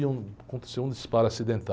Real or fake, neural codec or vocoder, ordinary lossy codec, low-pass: real; none; none; none